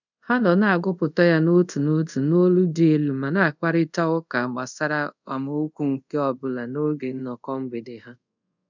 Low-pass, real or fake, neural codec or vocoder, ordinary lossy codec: 7.2 kHz; fake; codec, 24 kHz, 0.5 kbps, DualCodec; none